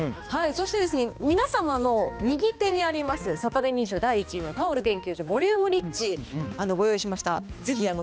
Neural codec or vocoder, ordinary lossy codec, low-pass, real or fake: codec, 16 kHz, 2 kbps, X-Codec, HuBERT features, trained on balanced general audio; none; none; fake